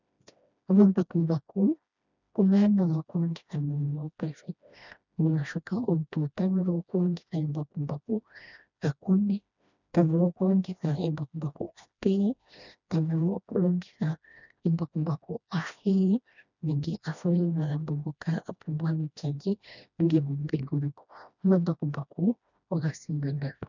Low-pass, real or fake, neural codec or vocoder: 7.2 kHz; fake; codec, 16 kHz, 1 kbps, FreqCodec, smaller model